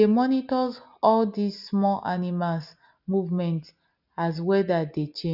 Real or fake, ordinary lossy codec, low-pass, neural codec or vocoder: real; none; 5.4 kHz; none